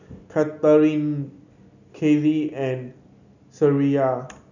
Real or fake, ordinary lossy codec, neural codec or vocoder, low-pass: real; none; none; 7.2 kHz